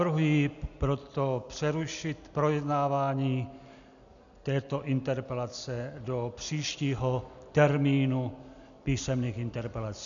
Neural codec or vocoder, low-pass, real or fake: none; 7.2 kHz; real